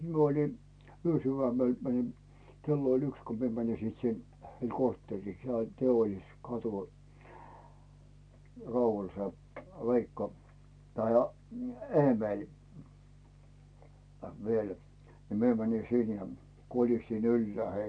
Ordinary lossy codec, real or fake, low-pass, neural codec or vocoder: none; real; 9.9 kHz; none